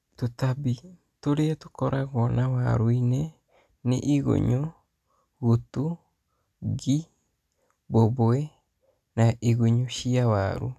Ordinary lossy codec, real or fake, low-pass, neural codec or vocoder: none; real; 14.4 kHz; none